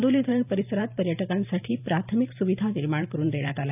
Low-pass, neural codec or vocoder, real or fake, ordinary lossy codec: 3.6 kHz; vocoder, 44.1 kHz, 128 mel bands every 512 samples, BigVGAN v2; fake; none